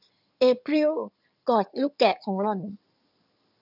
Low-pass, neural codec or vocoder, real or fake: 5.4 kHz; codec, 16 kHz in and 24 kHz out, 2.2 kbps, FireRedTTS-2 codec; fake